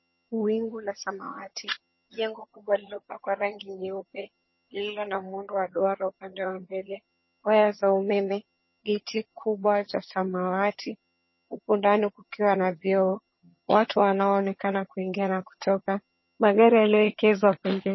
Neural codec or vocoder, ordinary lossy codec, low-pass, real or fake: vocoder, 22.05 kHz, 80 mel bands, HiFi-GAN; MP3, 24 kbps; 7.2 kHz; fake